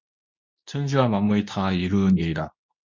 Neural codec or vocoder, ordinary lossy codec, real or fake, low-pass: codec, 24 kHz, 0.9 kbps, WavTokenizer, medium speech release version 2; MP3, 64 kbps; fake; 7.2 kHz